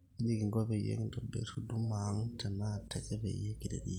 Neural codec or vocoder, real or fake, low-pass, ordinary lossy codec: none; real; 19.8 kHz; none